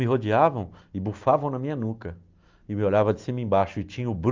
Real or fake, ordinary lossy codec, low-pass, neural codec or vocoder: real; Opus, 24 kbps; 7.2 kHz; none